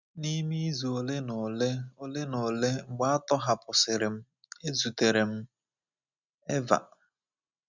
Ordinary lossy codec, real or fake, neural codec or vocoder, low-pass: none; real; none; 7.2 kHz